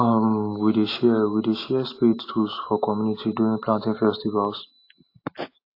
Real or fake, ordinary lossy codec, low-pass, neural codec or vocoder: real; AAC, 32 kbps; 5.4 kHz; none